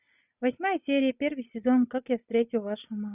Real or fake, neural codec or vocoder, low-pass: real; none; 3.6 kHz